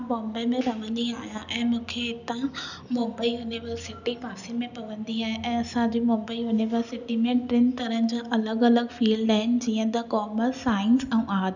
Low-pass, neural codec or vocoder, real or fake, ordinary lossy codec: 7.2 kHz; none; real; none